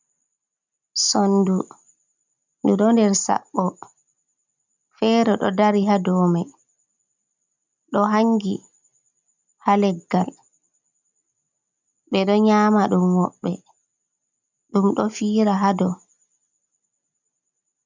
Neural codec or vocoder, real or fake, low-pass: none; real; 7.2 kHz